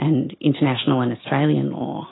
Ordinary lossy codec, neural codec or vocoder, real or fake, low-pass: AAC, 16 kbps; none; real; 7.2 kHz